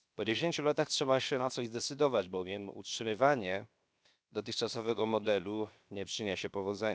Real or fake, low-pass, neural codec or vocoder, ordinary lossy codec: fake; none; codec, 16 kHz, 0.7 kbps, FocalCodec; none